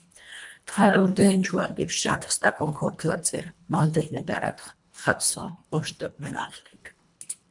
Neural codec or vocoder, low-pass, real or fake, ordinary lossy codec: codec, 24 kHz, 1.5 kbps, HILCodec; 10.8 kHz; fake; MP3, 96 kbps